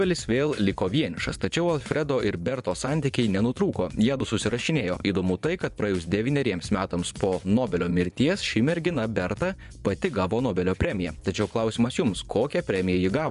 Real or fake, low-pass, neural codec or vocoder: fake; 10.8 kHz; vocoder, 24 kHz, 100 mel bands, Vocos